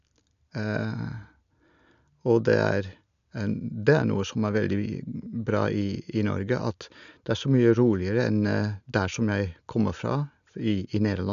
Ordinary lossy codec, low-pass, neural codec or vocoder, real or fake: none; 7.2 kHz; none; real